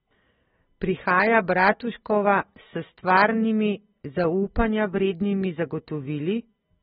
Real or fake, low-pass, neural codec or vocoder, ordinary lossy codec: real; 7.2 kHz; none; AAC, 16 kbps